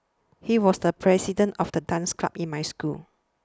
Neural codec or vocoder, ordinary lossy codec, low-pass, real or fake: none; none; none; real